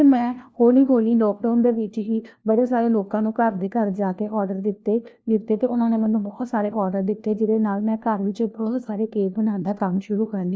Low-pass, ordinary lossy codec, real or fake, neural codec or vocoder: none; none; fake; codec, 16 kHz, 1 kbps, FunCodec, trained on LibriTTS, 50 frames a second